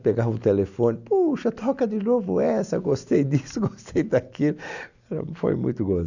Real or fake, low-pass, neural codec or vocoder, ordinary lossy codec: real; 7.2 kHz; none; none